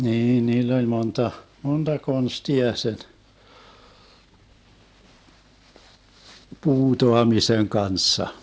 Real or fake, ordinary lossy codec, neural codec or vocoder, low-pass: real; none; none; none